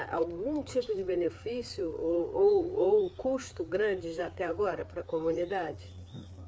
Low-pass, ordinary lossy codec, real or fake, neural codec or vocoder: none; none; fake; codec, 16 kHz, 4 kbps, FreqCodec, larger model